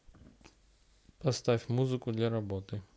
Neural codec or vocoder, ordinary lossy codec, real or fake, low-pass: none; none; real; none